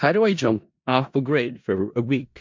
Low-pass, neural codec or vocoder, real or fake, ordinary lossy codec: 7.2 kHz; codec, 16 kHz in and 24 kHz out, 0.4 kbps, LongCat-Audio-Codec, four codebook decoder; fake; MP3, 48 kbps